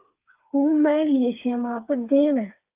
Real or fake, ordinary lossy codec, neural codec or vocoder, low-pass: fake; Opus, 32 kbps; codec, 16 kHz, 4 kbps, FreqCodec, smaller model; 3.6 kHz